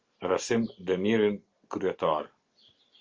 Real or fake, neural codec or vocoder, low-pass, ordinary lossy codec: real; none; 7.2 kHz; Opus, 24 kbps